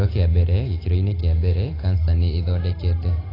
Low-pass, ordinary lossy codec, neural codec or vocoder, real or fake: 5.4 kHz; AAC, 24 kbps; none; real